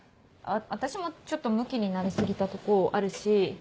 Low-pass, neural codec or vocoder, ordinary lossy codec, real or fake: none; none; none; real